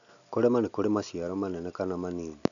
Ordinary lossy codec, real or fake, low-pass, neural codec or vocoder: none; real; 7.2 kHz; none